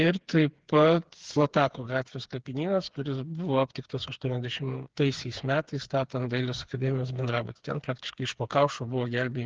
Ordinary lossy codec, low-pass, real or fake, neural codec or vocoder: Opus, 16 kbps; 7.2 kHz; fake; codec, 16 kHz, 4 kbps, FreqCodec, smaller model